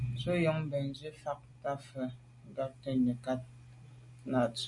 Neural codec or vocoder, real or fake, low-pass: none; real; 10.8 kHz